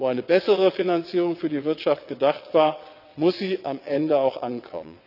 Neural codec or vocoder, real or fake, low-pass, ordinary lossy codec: vocoder, 22.05 kHz, 80 mel bands, WaveNeXt; fake; 5.4 kHz; none